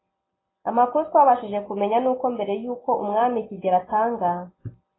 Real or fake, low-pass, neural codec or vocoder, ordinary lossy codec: real; 7.2 kHz; none; AAC, 16 kbps